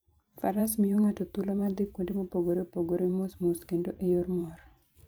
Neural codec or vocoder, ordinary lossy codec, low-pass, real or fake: vocoder, 44.1 kHz, 128 mel bands every 512 samples, BigVGAN v2; none; none; fake